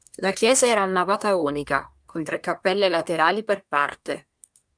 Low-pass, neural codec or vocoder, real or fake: 9.9 kHz; codec, 24 kHz, 1 kbps, SNAC; fake